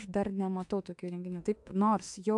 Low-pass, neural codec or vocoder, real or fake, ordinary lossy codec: 10.8 kHz; autoencoder, 48 kHz, 32 numbers a frame, DAC-VAE, trained on Japanese speech; fake; AAC, 64 kbps